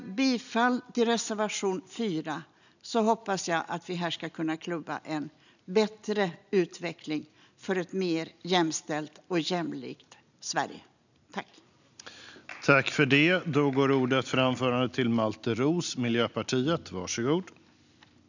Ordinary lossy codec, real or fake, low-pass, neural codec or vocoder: none; real; 7.2 kHz; none